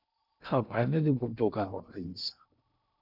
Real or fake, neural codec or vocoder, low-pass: fake; codec, 16 kHz in and 24 kHz out, 0.6 kbps, FocalCodec, streaming, 2048 codes; 5.4 kHz